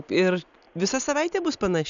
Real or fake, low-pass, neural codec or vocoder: real; 7.2 kHz; none